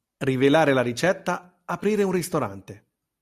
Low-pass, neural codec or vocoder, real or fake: 14.4 kHz; none; real